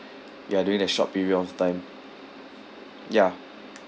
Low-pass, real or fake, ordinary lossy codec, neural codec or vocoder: none; real; none; none